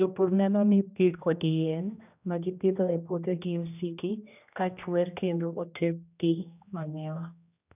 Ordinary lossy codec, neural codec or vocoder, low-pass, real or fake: none; codec, 16 kHz, 1 kbps, X-Codec, HuBERT features, trained on general audio; 3.6 kHz; fake